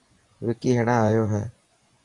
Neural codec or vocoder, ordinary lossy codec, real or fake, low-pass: vocoder, 24 kHz, 100 mel bands, Vocos; AAC, 64 kbps; fake; 10.8 kHz